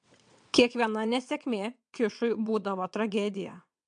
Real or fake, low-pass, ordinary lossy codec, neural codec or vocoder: fake; 9.9 kHz; MP3, 64 kbps; vocoder, 22.05 kHz, 80 mel bands, WaveNeXt